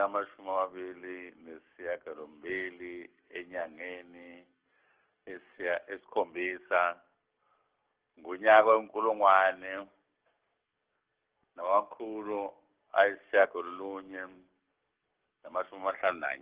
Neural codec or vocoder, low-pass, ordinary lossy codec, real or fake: codec, 44.1 kHz, 7.8 kbps, DAC; 3.6 kHz; Opus, 16 kbps; fake